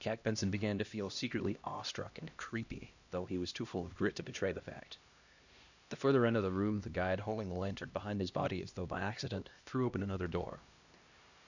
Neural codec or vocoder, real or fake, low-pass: codec, 16 kHz, 1 kbps, X-Codec, HuBERT features, trained on LibriSpeech; fake; 7.2 kHz